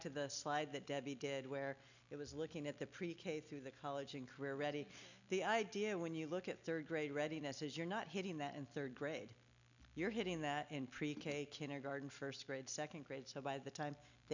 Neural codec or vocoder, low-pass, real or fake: none; 7.2 kHz; real